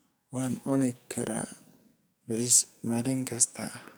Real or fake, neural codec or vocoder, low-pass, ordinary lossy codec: fake; codec, 44.1 kHz, 2.6 kbps, SNAC; none; none